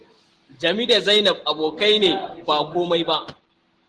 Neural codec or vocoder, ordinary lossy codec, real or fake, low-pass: none; Opus, 16 kbps; real; 10.8 kHz